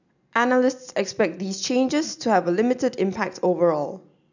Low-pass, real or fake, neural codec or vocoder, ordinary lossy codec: 7.2 kHz; real; none; none